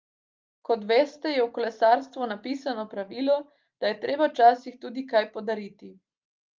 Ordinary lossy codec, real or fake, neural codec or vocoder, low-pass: Opus, 24 kbps; real; none; 7.2 kHz